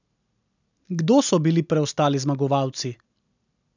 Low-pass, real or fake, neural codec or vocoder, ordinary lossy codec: 7.2 kHz; real; none; none